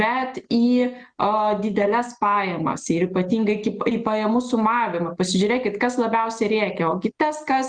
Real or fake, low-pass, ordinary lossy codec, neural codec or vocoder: real; 9.9 kHz; Opus, 32 kbps; none